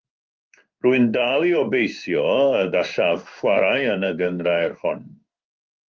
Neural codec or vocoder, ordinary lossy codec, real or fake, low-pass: codec, 16 kHz in and 24 kHz out, 1 kbps, XY-Tokenizer; Opus, 24 kbps; fake; 7.2 kHz